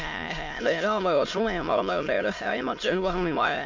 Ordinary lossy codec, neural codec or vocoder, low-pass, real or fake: MP3, 48 kbps; autoencoder, 22.05 kHz, a latent of 192 numbers a frame, VITS, trained on many speakers; 7.2 kHz; fake